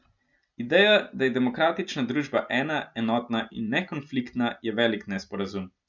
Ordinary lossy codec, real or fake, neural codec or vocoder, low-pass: none; real; none; 7.2 kHz